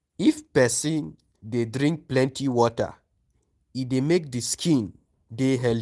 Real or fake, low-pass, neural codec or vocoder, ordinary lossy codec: real; 10.8 kHz; none; Opus, 24 kbps